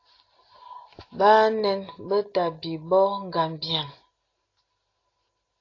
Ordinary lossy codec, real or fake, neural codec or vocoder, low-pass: AAC, 32 kbps; real; none; 7.2 kHz